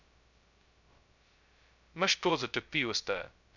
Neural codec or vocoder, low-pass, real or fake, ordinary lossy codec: codec, 16 kHz, 0.2 kbps, FocalCodec; 7.2 kHz; fake; none